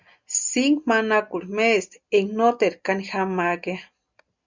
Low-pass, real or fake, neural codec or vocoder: 7.2 kHz; real; none